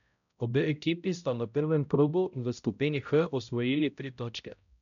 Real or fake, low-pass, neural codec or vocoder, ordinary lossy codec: fake; 7.2 kHz; codec, 16 kHz, 0.5 kbps, X-Codec, HuBERT features, trained on balanced general audio; none